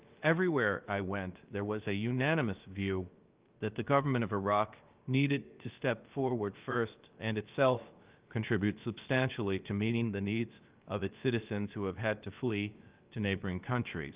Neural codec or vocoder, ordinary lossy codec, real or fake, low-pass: codec, 16 kHz, about 1 kbps, DyCAST, with the encoder's durations; Opus, 32 kbps; fake; 3.6 kHz